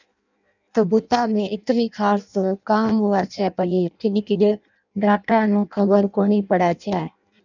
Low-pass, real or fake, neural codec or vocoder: 7.2 kHz; fake; codec, 16 kHz in and 24 kHz out, 0.6 kbps, FireRedTTS-2 codec